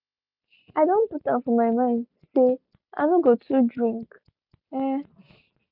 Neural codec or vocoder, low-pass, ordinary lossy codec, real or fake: none; 5.4 kHz; none; real